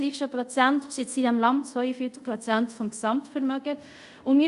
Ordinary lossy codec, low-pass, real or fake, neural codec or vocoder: Opus, 64 kbps; 10.8 kHz; fake; codec, 24 kHz, 0.5 kbps, DualCodec